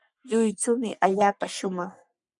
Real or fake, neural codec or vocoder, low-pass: fake; codec, 44.1 kHz, 3.4 kbps, Pupu-Codec; 10.8 kHz